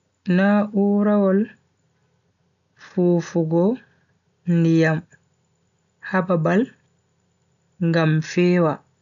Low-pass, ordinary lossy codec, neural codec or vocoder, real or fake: 7.2 kHz; none; none; real